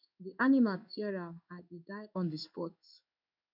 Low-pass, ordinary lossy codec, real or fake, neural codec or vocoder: 5.4 kHz; none; fake; codec, 16 kHz in and 24 kHz out, 1 kbps, XY-Tokenizer